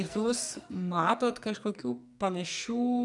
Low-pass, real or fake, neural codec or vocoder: 10.8 kHz; fake; codec, 44.1 kHz, 2.6 kbps, SNAC